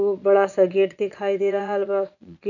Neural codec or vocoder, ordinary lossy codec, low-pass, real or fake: vocoder, 22.05 kHz, 80 mel bands, Vocos; none; 7.2 kHz; fake